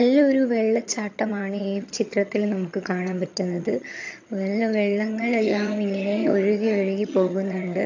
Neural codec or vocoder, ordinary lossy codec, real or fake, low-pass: vocoder, 22.05 kHz, 80 mel bands, HiFi-GAN; AAC, 48 kbps; fake; 7.2 kHz